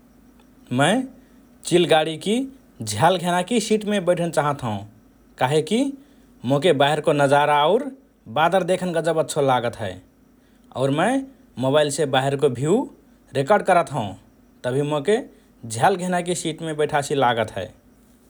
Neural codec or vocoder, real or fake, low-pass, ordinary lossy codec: none; real; none; none